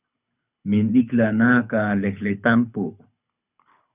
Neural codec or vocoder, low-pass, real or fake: codec, 24 kHz, 6 kbps, HILCodec; 3.6 kHz; fake